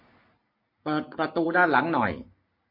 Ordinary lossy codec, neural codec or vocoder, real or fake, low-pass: MP3, 32 kbps; none; real; 5.4 kHz